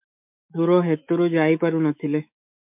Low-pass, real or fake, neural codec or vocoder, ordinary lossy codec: 3.6 kHz; fake; autoencoder, 48 kHz, 128 numbers a frame, DAC-VAE, trained on Japanese speech; MP3, 32 kbps